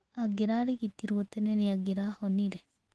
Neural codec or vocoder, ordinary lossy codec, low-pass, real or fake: autoencoder, 48 kHz, 128 numbers a frame, DAC-VAE, trained on Japanese speech; Opus, 16 kbps; 10.8 kHz; fake